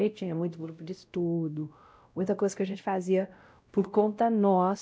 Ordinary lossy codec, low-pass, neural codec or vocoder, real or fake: none; none; codec, 16 kHz, 0.5 kbps, X-Codec, WavLM features, trained on Multilingual LibriSpeech; fake